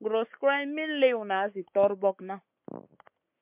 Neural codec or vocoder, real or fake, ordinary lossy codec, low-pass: codec, 44.1 kHz, 7.8 kbps, Pupu-Codec; fake; MP3, 32 kbps; 3.6 kHz